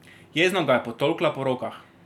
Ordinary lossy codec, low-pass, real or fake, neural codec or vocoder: none; 19.8 kHz; real; none